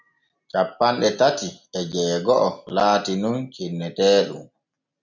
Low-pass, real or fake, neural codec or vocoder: 7.2 kHz; real; none